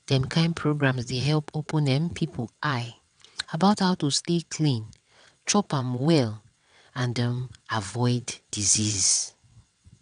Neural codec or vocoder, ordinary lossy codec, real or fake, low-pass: vocoder, 22.05 kHz, 80 mel bands, WaveNeXt; none; fake; 9.9 kHz